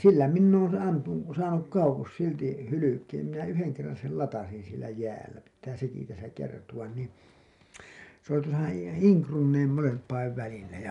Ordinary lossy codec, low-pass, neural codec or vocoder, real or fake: none; 10.8 kHz; none; real